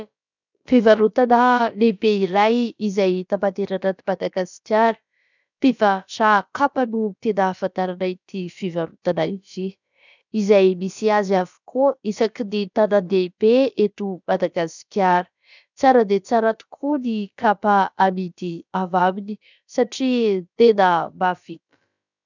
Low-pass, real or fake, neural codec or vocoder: 7.2 kHz; fake; codec, 16 kHz, about 1 kbps, DyCAST, with the encoder's durations